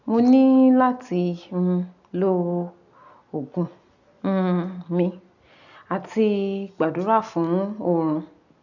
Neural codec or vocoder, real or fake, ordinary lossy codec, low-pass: none; real; none; 7.2 kHz